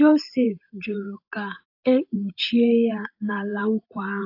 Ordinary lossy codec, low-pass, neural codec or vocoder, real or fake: AAC, 32 kbps; 5.4 kHz; vocoder, 44.1 kHz, 128 mel bands every 512 samples, BigVGAN v2; fake